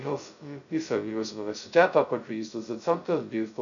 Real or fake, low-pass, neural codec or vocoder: fake; 7.2 kHz; codec, 16 kHz, 0.2 kbps, FocalCodec